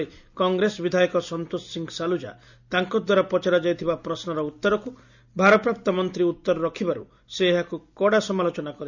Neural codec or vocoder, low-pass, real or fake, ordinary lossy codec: none; 7.2 kHz; real; none